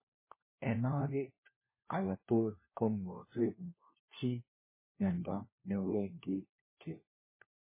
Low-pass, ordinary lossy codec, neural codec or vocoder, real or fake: 3.6 kHz; MP3, 16 kbps; codec, 16 kHz, 1 kbps, FunCodec, trained on LibriTTS, 50 frames a second; fake